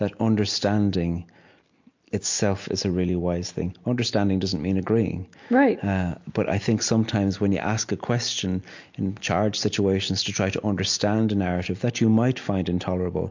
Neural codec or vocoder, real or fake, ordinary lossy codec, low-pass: none; real; MP3, 48 kbps; 7.2 kHz